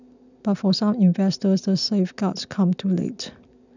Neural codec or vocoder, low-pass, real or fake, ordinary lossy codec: none; 7.2 kHz; real; none